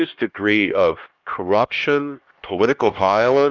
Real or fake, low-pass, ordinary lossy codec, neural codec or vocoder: fake; 7.2 kHz; Opus, 24 kbps; codec, 16 kHz, 1 kbps, X-Codec, HuBERT features, trained on LibriSpeech